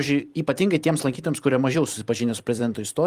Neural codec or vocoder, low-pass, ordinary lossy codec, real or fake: vocoder, 44.1 kHz, 128 mel bands every 256 samples, BigVGAN v2; 14.4 kHz; Opus, 24 kbps; fake